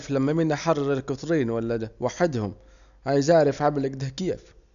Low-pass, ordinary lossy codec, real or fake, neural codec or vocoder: 7.2 kHz; none; real; none